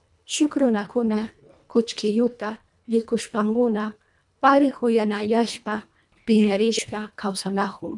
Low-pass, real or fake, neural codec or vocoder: 10.8 kHz; fake; codec, 24 kHz, 1.5 kbps, HILCodec